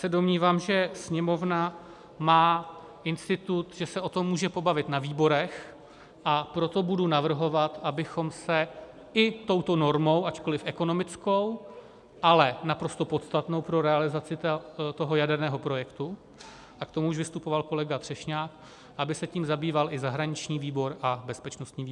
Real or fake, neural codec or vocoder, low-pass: real; none; 10.8 kHz